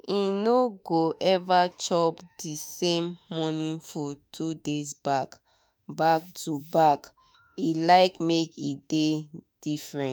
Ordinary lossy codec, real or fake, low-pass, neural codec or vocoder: none; fake; none; autoencoder, 48 kHz, 32 numbers a frame, DAC-VAE, trained on Japanese speech